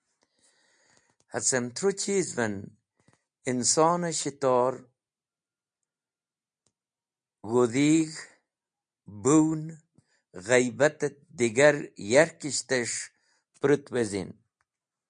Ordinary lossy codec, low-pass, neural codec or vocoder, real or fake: MP3, 64 kbps; 9.9 kHz; none; real